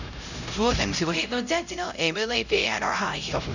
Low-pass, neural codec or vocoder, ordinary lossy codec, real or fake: 7.2 kHz; codec, 16 kHz, 0.5 kbps, X-Codec, HuBERT features, trained on LibriSpeech; none; fake